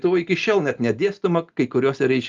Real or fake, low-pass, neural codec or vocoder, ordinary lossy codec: real; 7.2 kHz; none; Opus, 32 kbps